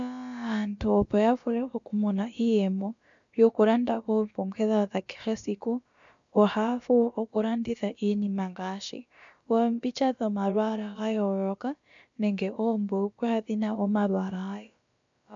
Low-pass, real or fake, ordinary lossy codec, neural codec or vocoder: 7.2 kHz; fake; MP3, 64 kbps; codec, 16 kHz, about 1 kbps, DyCAST, with the encoder's durations